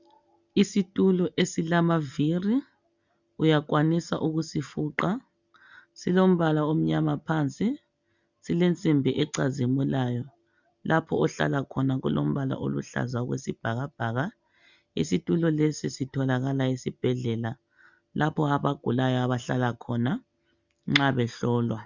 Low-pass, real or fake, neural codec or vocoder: 7.2 kHz; real; none